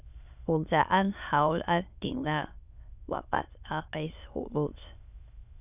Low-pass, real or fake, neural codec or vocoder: 3.6 kHz; fake; autoencoder, 22.05 kHz, a latent of 192 numbers a frame, VITS, trained on many speakers